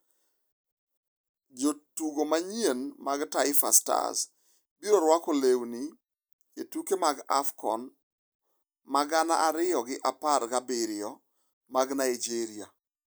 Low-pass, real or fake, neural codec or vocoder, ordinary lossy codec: none; real; none; none